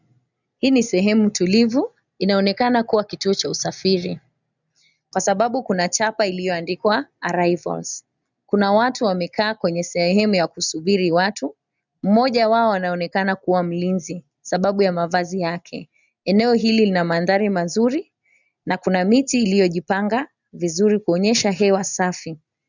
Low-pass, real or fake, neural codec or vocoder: 7.2 kHz; real; none